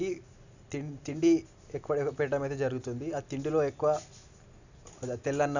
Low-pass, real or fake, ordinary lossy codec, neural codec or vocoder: 7.2 kHz; real; none; none